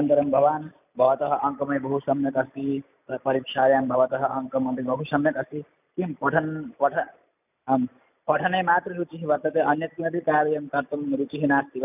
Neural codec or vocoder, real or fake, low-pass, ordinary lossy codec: none; real; 3.6 kHz; none